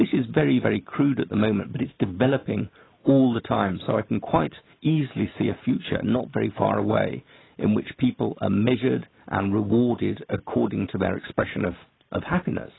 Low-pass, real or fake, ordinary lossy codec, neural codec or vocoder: 7.2 kHz; real; AAC, 16 kbps; none